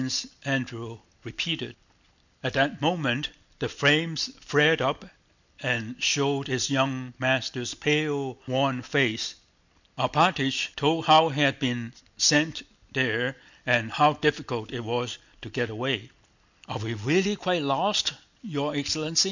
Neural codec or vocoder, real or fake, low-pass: none; real; 7.2 kHz